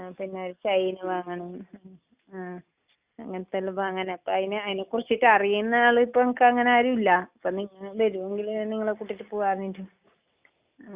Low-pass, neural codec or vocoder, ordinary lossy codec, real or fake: 3.6 kHz; none; Opus, 64 kbps; real